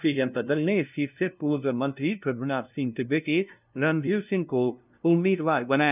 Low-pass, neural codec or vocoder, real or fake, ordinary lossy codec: 3.6 kHz; codec, 16 kHz, 0.5 kbps, FunCodec, trained on LibriTTS, 25 frames a second; fake; none